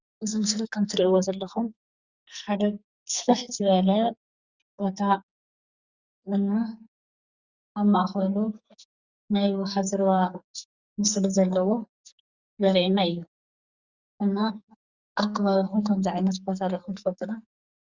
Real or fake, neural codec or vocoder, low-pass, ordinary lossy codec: fake; codec, 44.1 kHz, 2.6 kbps, SNAC; 7.2 kHz; Opus, 64 kbps